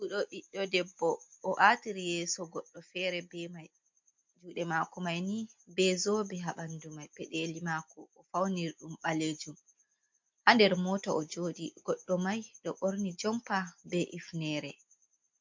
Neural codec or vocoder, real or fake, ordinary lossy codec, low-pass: none; real; MP3, 48 kbps; 7.2 kHz